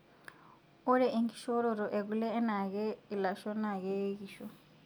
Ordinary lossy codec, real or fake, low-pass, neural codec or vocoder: none; real; none; none